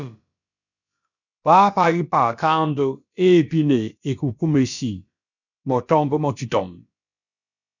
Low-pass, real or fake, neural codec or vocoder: 7.2 kHz; fake; codec, 16 kHz, about 1 kbps, DyCAST, with the encoder's durations